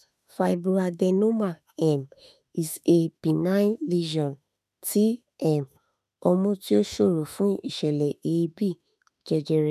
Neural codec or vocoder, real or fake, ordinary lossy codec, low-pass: autoencoder, 48 kHz, 32 numbers a frame, DAC-VAE, trained on Japanese speech; fake; none; 14.4 kHz